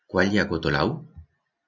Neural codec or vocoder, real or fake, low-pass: none; real; 7.2 kHz